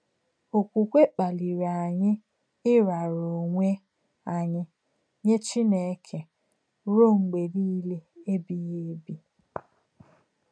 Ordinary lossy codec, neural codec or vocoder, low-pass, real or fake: MP3, 96 kbps; none; 9.9 kHz; real